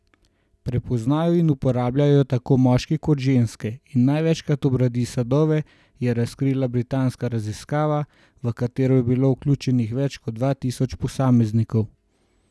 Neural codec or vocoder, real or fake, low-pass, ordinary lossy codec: none; real; none; none